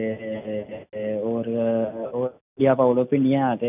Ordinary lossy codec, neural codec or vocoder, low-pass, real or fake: none; none; 3.6 kHz; real